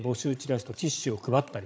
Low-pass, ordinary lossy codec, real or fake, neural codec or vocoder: none; none; fake; codec, 16 kHz, 16 kbps, FreqCodec, larger model